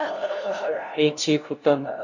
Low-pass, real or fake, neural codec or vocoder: 7.2 kHz; fake; codec, 16 kHz, 0.5 kbps, FunCodec, trained on LibriTTS, 25 frames a second